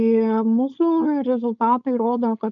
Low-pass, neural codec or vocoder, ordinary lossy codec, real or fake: 7.2 kHz; codec, 16 kHz, 4.8 kbps, FACodec; AAC, 64 kbps; fake